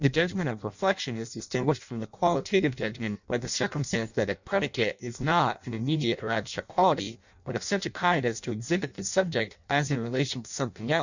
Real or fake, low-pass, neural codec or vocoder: fake; 7.2 kHz; codec, 16 kHz in and 24 kHz out, 0.6 kbps, FireRedTTS-2 codec